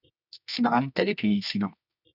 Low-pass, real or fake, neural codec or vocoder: 5.4 kHz; fake; codec, 24 kHz, 0.9 kbps, WavTokenizer, medium music audio release